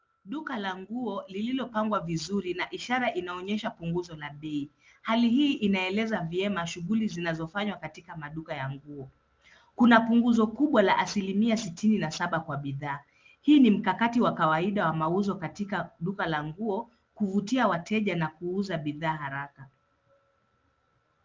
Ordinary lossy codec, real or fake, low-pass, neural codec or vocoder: Opus, 32 kbps; real; 7.2 kHz; none